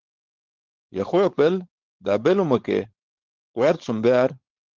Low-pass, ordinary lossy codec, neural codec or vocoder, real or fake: 7.2 kHz; Opus, 16 kbps; codec, 16 kHz, 4.8 kbps, FACodec; fake